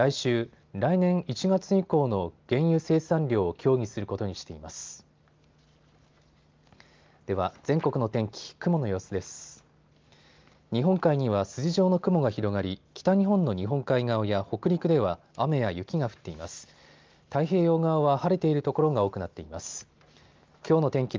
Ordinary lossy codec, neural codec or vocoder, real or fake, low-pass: Opus, 32 kbps; none; real; 7.2 kHz